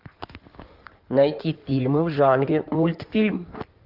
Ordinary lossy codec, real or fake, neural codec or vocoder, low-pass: Opus, 32 kbps; fake; codec, 16 kHz in and 24 kHz out, 2.2 kbps, FireRedTTS-2 codec; 5.4 kHz